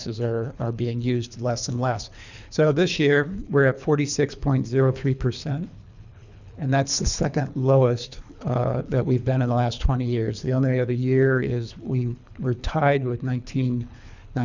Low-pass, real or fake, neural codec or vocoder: 7.2 kHz; fake; codec, 24 kHz, 3 kbps, HILCodec